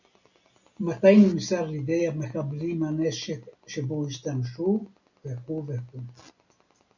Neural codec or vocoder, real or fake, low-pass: none; real; 7.2 kHz